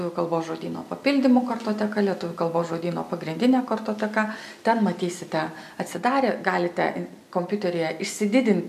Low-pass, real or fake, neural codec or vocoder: 14.4 kHz; real; none